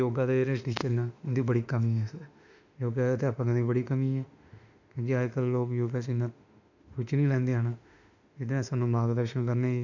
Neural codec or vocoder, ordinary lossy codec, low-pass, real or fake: autoencoder, 48 kHz, 32 numbers a frame, DAC-VAE, trained on Japanese speech; none; 7.2 kHz; fake